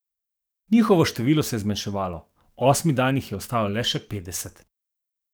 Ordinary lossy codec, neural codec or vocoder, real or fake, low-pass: none; codec, 44.1 kHz, 7.8 kbps, DAC; fake; none